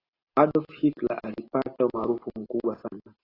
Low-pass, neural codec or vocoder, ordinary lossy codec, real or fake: 5.4 kHz; none; AAC, 24 kbps; real